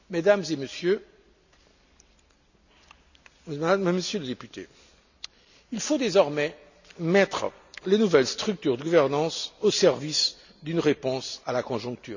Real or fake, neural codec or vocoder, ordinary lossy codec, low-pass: real; none; none; 7.2 kHz